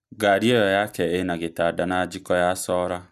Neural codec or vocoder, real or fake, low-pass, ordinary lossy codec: none; real; 14.4 kHz; none